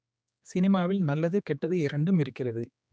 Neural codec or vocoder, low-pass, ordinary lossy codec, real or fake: codec, 16 kHz, 2 kbps, X-Codec, HuBERT features, trained on general audio; none; none; fake